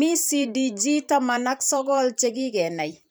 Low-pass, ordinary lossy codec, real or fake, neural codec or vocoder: none; none; fake; vocoder, 44.1 kHz, 128 mel bands every 512 samples, BigVGAN v2